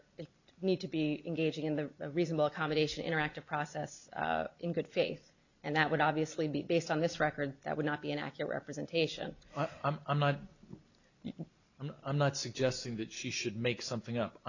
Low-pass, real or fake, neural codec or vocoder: 7.2 kHz; real; none